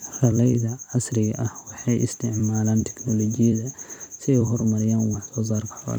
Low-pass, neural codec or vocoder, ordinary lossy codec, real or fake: 19.8 kHz; vocoder, 44.1 kHz, 128 mel bands every 256 samples, BigVGAN v2; none; fake